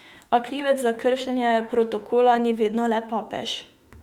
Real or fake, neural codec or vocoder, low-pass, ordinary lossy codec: fake; autoencoder, 48 kHz, 32 numbers a frame, DAC-VAE, trained on Japanese speech; 19.8 kHz; Opus, 64 kbps